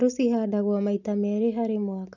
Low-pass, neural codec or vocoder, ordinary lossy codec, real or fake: 7.2 kHz; none; none; real